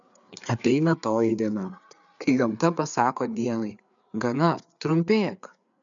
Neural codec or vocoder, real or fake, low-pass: codec, 16 kHz, 4 kbps, FreqCodec, larger model; fake; 7.2 kHz